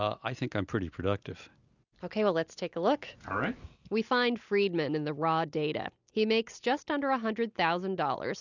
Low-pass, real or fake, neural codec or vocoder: 7.2 kHz; real; none